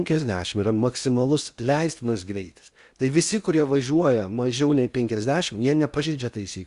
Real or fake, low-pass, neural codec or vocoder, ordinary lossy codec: fake; 10.8 kHz; codec, 16 kHz in and 24 kHz out, 0.8 kbps, FocalCodec, streaming, 65536 codes; Opus, 64 kbps